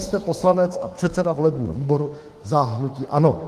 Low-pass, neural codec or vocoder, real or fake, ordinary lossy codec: 14.4 kHz; autoencoder, 48 kHz, 32 numbers a frame, DAC-VAE, trained on Japanese speech; fake; Opus, 24 kbps